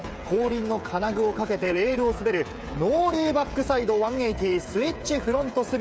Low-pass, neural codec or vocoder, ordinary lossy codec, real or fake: none; codec, 16 kHz, 8 kbps, FreqCodec, smaller model; none; fake